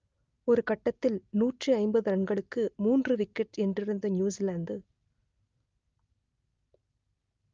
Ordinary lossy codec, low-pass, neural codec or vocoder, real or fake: Opus, 24 kbps; 7.2 kHz; none; real